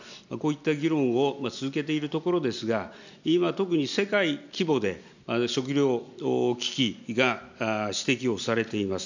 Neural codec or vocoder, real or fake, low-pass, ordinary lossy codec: none; real; 7.2 kHz; none